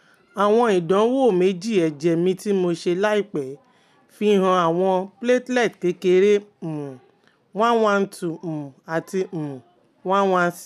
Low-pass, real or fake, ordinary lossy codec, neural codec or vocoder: 14.4 kHz; real; none; none